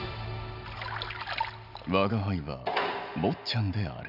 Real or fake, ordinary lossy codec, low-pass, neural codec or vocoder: real; none; 5.4 kHz; none